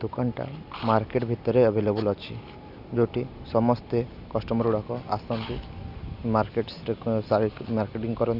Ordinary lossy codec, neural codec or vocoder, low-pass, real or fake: none; none; 5.4 kHz; real